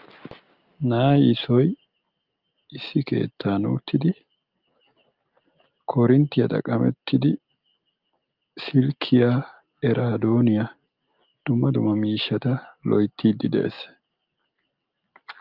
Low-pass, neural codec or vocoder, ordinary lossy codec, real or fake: 5.4 kHz; none; Opus, 24 kbps; real